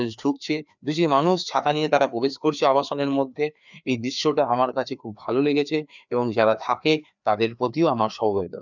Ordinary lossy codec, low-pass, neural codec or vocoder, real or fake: none; 7.2 kHz; codec, 16 kHz, 2 kbps, FreqCodec, larger model; fake